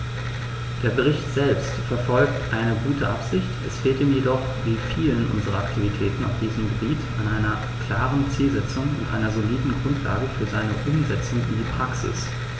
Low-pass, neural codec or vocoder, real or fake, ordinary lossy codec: none; none; real; none